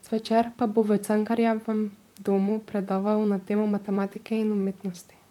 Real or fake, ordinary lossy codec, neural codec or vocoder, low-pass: fake; MP3, 96 kbps; vocoder, 44.1 kHz, 128 mel bands, Pupu-Vocoder; 19.8 kHz